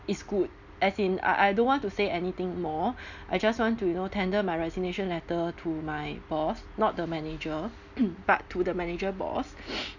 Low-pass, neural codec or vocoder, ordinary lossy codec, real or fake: 7.2 kHz; none; none; real